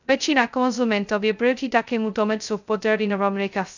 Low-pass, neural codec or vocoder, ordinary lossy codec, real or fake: 7.2 kHz; codec, 16 kHz, 0.2 kbps, FocalCodec; none; fake